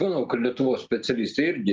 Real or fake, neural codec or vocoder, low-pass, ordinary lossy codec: real; none; 7.2 kHz; Opus, 24 kbps